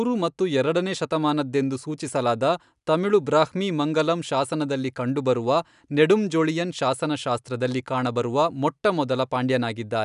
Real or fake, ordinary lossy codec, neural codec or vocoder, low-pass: real; none; none; 10.8 kHz